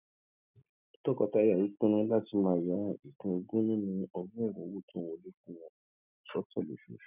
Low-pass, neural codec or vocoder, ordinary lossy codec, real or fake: 3.6 kHz; none; none; real